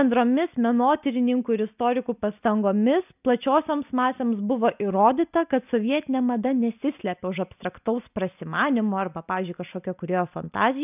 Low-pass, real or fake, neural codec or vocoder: 3.6 kHz; real; none